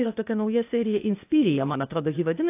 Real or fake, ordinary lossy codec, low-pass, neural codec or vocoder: fake; AAC, 24 kbps; 3.6 kHz; codec, 16 kHz, 0.8 kbps, ZipCodec